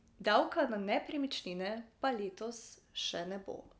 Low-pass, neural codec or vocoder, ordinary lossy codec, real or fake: none; none; none; real